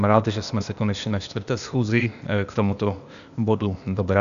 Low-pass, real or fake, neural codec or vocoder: 7.2 kHz; fake; codec, 16 kHz, 0.8 kbps, ZipCodec